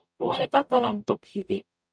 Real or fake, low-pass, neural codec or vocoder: fake; 9.9 kHz; codec, 44.1 kHz, 0.9 kbps, DAC